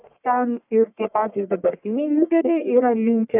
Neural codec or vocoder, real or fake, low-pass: codec, 44.1 kHz, 1.7 kbps, Pupu-Codec; fake; 3.6 kHz